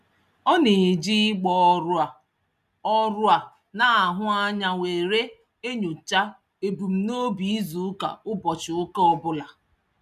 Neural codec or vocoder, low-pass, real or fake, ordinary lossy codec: none; 14.4 kHz; real; none